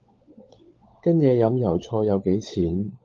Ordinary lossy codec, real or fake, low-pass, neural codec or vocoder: Opus, 32 kbps; fake; 7.2 kHz; codec, 16 kHz, 16 kbps, FunCodec, trained on LibriTTS, 50 frames a second